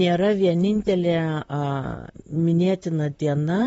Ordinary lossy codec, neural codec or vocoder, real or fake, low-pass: AAC, 24 kbps; vocoder, 44.1 kHz, 128 mel bands, Pupu-Vocoder; fake; 19.8 kHz